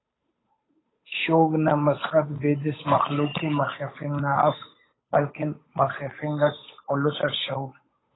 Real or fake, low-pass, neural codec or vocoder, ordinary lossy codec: fake; 7.2 kHz; codec, 16 kHz, 8 kbps, FunCodec, trained on Chinese and English, 25 frames a second; AAC, 16 kbps